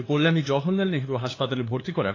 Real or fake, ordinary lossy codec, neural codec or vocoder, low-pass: fake; AAC, 32 kbps; codec, 16 kHz, 2 kbps, FunCodec, trained on LibriTTS, 25 frames a second; 7.2 kHz